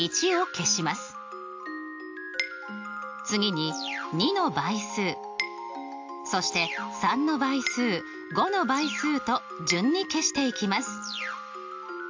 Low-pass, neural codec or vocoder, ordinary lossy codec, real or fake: 7.2 kHz; none; AAC, 48 kbps; real